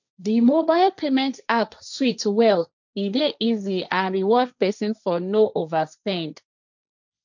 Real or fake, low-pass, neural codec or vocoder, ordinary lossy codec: fake; 7.2 kHz; codec, 16 kHz, 1.1 kbps, Voila-Tokenizer; none